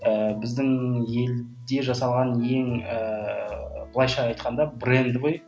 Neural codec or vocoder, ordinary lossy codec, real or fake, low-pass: none; none; real; none